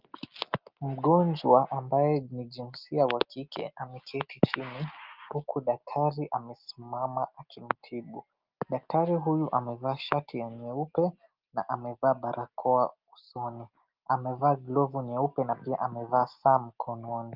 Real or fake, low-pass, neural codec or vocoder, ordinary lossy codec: real; 5.4 kHz; none; Opus, 24 kbps